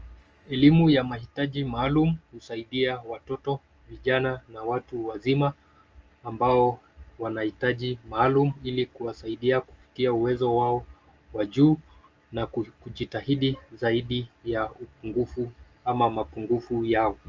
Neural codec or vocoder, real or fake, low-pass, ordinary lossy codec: none; real; 7.2 kHz; Opus, 24 kbps